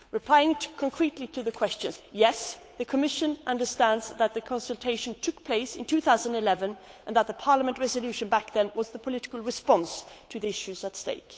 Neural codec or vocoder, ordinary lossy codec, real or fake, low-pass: codec, 16 kHz, 8 kbps, FunCodec, trained on Chinese and English, 25 frames a second; none; fake; none